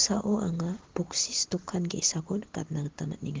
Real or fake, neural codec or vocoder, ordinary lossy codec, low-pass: real; none; Opus, 16 kbps; 7.2 kHz